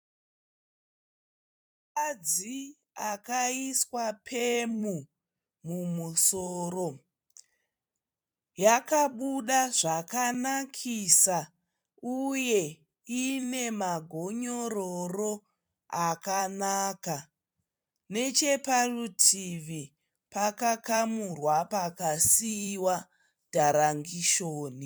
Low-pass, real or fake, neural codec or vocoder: 19.8 kHz; fake; vocoder, 44.1 kHz, 128 mel bands every 256 samples, BigVGAN v2